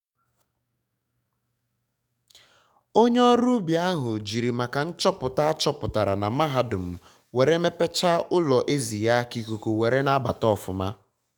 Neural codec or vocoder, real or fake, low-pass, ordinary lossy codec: codec, 44.1 kHz, 7.8 kbps, DAC; fake; 19.8 kHz; none